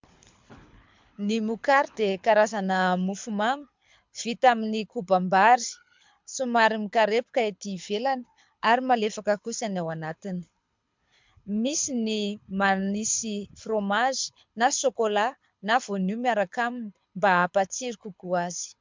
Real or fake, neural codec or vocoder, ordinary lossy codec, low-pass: fake; codec, 24 kHz, 6 kbps, HILCodec; MP3, 64 kbps; 7.2 kHz